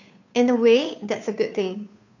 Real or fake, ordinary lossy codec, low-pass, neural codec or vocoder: fake; none; 7.2 kHz; codec, 16 kHz, 2 kbps, FunCodec, trained on Chinese and English, 25 frames a second